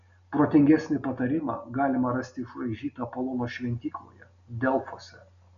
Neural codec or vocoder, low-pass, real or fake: none; 7.2 kHz; real